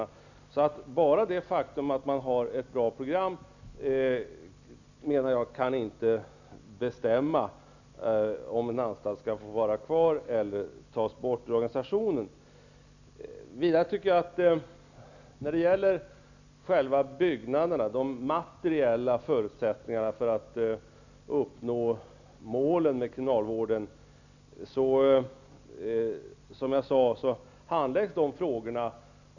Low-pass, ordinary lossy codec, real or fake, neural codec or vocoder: 7.2 kHz; none; real; none